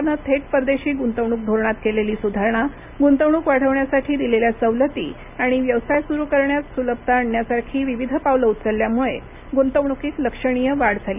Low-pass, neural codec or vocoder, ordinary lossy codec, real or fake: 3.6 kHz; none; none; real